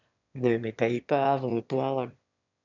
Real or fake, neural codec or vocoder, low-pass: fake; autoencoder, 22.05 kHz, a latent of 192 numbers a frame, VITS, trained on one speaker; 7.2 kHz